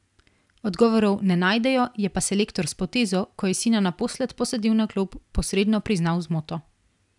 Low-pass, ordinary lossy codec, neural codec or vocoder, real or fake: 10.8 kHz; none; none; real